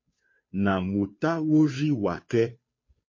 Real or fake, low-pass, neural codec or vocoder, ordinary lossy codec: fake; 7.2 kHz; codec, 16 kHz, 2 kbps, FunCodec, trained on Chinese and English, 25 frames a second; MP3, 32 kbps